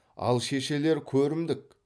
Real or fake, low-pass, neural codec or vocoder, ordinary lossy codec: real; none; none; none